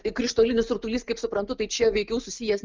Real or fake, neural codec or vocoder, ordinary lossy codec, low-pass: real; none; Opus, 24 kbps; 7.2 kHz